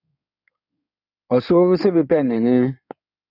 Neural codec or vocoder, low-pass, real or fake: codec, 16 kHz in and 24 kHz out, 2.2 kbps, FireRedTTS-2 codec; 5.4 kHz; fake